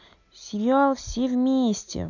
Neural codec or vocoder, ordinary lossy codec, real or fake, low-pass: none; none; real; 7.2 kHz